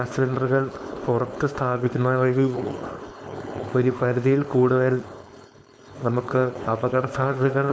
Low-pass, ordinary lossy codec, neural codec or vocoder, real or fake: none; none; codec, 16 kHz, 4.8 kbps, FACodec; fake